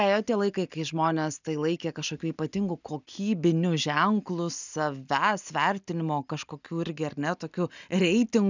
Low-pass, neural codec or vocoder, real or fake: 7.2 kHz; none; real